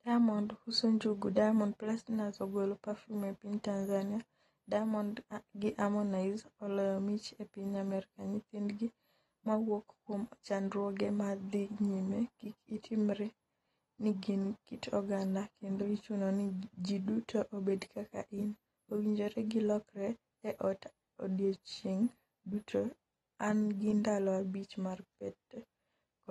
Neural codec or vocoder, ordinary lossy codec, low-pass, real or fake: none; AAC, 32 kbps; 19.8 kHz; real